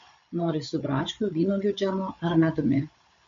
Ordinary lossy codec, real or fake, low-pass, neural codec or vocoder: MP3, 48 kbps; fake; 7.2 kHz; codec, 16 kHz, 8 kbps, FreqCodec, larger model